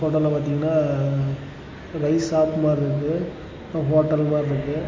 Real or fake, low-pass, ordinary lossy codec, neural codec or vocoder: real; 7.2 kHz; MP3, 32 kbps; none